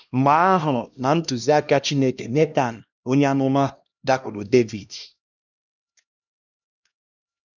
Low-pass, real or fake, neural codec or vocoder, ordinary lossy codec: 7.2 kHz; fake; codec, 16 kHz, 1 kbps, X-Codec, HuBERT features, trained on LibriSpeech; none